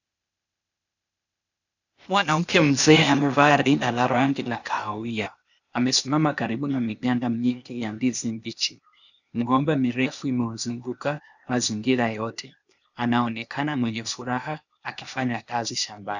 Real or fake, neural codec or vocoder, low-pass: fake; codec, 16 kHz, 0.8 kbps, ZipCodec; 7.2 kHz